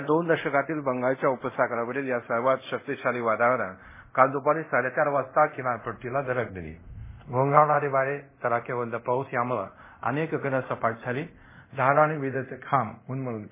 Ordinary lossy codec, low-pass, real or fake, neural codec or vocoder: MP3, 16 kbps; 3.6 kHz; fake; codec, 24 kHz, 0.5 kbps, DualCodec